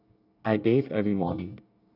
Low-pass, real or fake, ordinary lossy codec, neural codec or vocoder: 5.4 kHz; fake; none; codec, 24 kHz, 1 kbps, SNAC